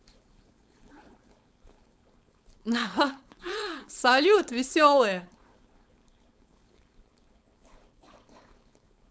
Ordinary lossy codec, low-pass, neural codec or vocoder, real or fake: none; none; codec, 16 kHz, 4.8 kbps, FACodec; fake